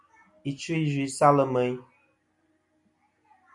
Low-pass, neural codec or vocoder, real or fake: 10.8 kHz; none; real